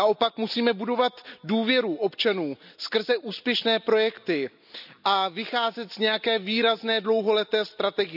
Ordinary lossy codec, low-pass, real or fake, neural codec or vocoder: none; 5.4 kHz; real; none